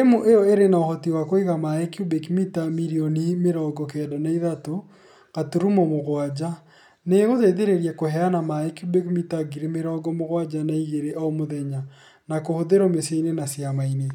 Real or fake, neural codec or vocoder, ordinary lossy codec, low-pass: real; none; none; 19.8 kHz